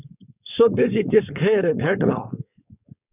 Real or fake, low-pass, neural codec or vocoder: fake; 3.6 kHz; codec, 16 kHz, 4.8 kbps, FACodec